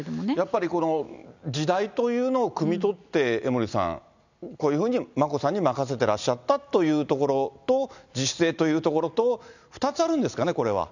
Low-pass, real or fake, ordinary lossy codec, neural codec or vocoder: 7.2 kHz; real; none; none